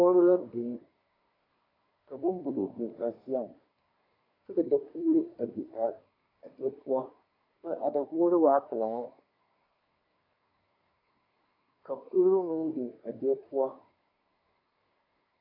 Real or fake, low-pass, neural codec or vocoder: fake; 5.4 kHz; codec, 24 kHz, 1 kbps, SNAC